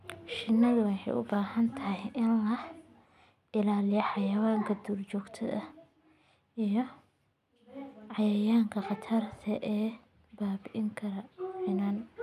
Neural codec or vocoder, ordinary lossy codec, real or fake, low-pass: none; none; real; 14.4 kHz